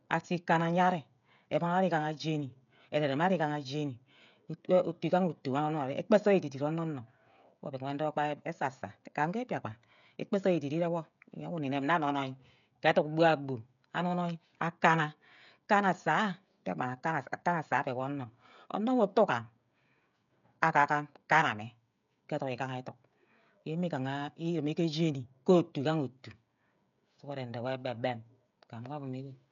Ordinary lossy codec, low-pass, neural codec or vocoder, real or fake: none; 7.2 kHz; codec, 16 kHz, 16 kbps, FreqCodec, smaller model; fake